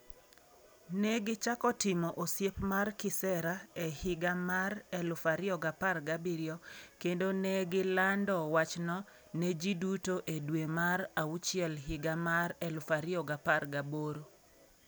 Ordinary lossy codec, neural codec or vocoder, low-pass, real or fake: none; none; none; real